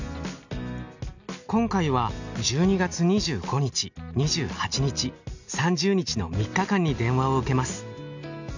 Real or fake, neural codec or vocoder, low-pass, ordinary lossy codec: real; none; 7.2 kHz; none